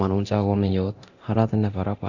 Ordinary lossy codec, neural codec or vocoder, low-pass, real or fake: none; codec, 24 kHz, 0.9 kbps, DualCodec; 7.2 kHz; fake